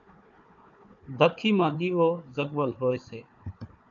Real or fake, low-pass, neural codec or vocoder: fake; 7.2 kHz; codec, 16 kHz, 4 kbps, FunCodec, trained on Chinese and English, 50 frames a second